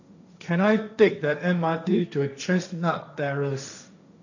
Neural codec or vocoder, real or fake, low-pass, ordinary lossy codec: codec, 16 kHz, 1.1 kbps, Voila-Tokenizer; fake; 7.2 kHz; none